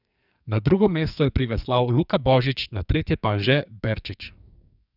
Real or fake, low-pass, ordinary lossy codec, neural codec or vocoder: fake; 5.4 kHz; none; codec, 44.1 kHz, 2.6 kbps, SNAC